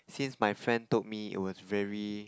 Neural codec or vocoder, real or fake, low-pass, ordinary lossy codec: none; real; none; none